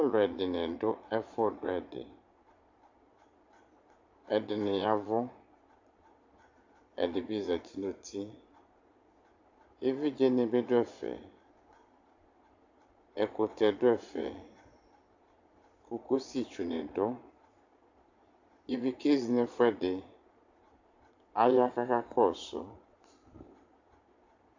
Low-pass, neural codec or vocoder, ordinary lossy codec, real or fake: 7.2 kHz; vocoder, 22.05 kHz, 80 mel bands, Vocos; AAC, 48 kbps; fake